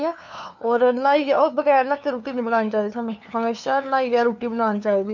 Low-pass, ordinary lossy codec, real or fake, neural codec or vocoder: 7.2 kHz; none; fake; codec, 16 kHz, 2 kbps, FunCodec, trained on LibriTTS, 25 frames a second